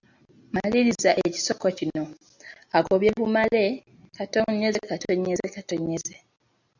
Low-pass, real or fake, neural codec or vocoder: 7.2 kHz; real; none